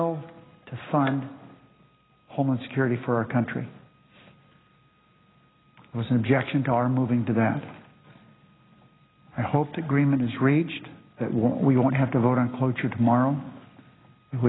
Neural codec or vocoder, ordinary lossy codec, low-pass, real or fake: none; AAC, 16 kbps; 7.2 kHz; real